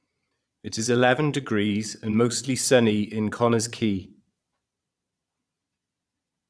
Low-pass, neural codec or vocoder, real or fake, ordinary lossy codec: none; vocoder, 22.05 kHz, 80 mel bands, Vocos; fake; none